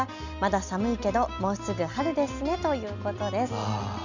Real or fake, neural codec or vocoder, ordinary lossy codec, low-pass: real; none; none; 7.2 kHz